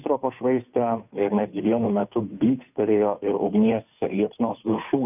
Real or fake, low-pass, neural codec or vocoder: fake; 3.6 kHz; codec, 16 kHz, 2 kbps, FunCodec, trained on Chinese and English, 25 frames a second